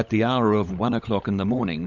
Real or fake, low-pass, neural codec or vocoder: fake; 7.2 kHz; codec, 16 kHz, 16 kbps, FunCodec, trained on LibriTTS, 50 frames a second